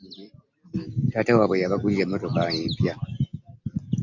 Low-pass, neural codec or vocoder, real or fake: 7.2 kHz; none; real